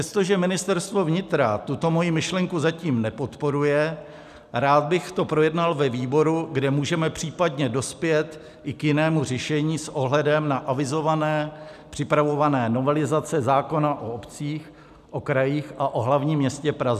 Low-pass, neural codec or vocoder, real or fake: 14.4 kHz; none; real